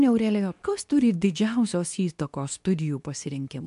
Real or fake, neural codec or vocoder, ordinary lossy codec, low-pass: fake; codec, 24 kHz, 0.9 kbps, WavTokenizer, medium speech release version 2; MP3, 64 kbps; 10.8 kHz